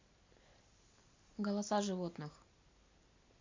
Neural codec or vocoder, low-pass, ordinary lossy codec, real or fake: none; 7.2 kHz; MP3, 48 kbps; real